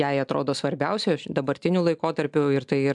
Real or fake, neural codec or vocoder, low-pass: real; none; 10.8 kHz